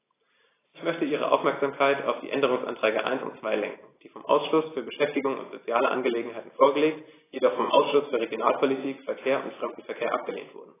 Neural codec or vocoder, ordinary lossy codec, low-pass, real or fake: none; AAC, 16 kbps; 3.6 kHz; real